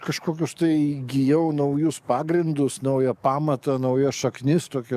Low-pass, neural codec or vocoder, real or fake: 14.4 kHz; codec, 44.1 kHz, 7.8 kbps, DAC; fake